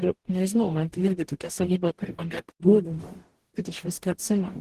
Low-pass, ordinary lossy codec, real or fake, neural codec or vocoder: 14.4 kHz; Opus, 16 kbps; fake; codec, 44.1 kHz, 0.9 kbps, DAC